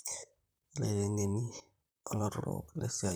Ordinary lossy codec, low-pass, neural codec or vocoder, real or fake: none; none; vocoder, 44.1 kHz, 128 mel bands, Pupu-Vocoder; fake